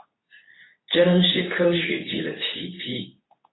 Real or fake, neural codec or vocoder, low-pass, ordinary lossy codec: fake; codec, 24 kHz, 0.9 kbps, WavTokenizer, medium speech release version 2; 7.2 kHz; AAC, 16 kbps